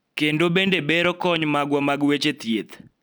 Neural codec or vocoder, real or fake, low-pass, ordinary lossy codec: none; real; none; none